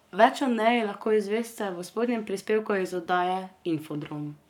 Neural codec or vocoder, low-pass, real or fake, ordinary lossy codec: codec, 44.1 kHz, 7.8 kbps, Pupu-Codec; 19.8 kHz; fake; none